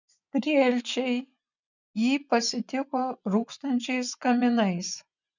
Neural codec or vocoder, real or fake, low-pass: vocoder, 24 kHz, 100 mel bands, Vocos; fake; 7.2 kHz